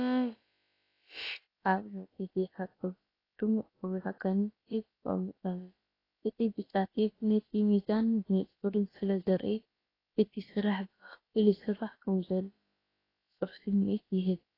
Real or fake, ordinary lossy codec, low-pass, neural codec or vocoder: fake; AAC, 24 kbps; 5.4 kHz; codec, 16 kHz, about 1 kbps, DyCAST, with the encoder's durations